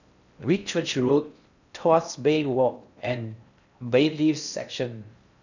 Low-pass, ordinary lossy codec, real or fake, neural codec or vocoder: 7.2 kHz; none; fake; codec, 16 kHz in and 24 kHz out, 0.6 kbps, FocalCodec, streaming, 4096 codes